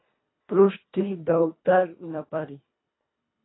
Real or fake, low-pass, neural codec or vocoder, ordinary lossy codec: fake; 7.2 kHz; codec, 24 kHz, 1.5 kbps, HILCodec; AAC, 16 kbps